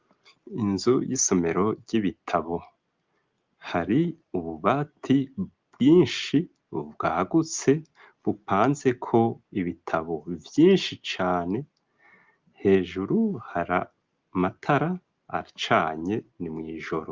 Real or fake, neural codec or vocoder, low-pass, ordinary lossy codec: real; none; 7.2 kHz; Opus, 32 kbps